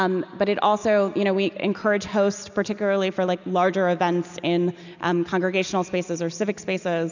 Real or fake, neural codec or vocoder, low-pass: real; none; 7.2 kHz